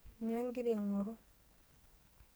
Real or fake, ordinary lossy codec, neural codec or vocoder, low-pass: fake; none; codec, 44.1 kHz, 2.6 kbps, SNAC; none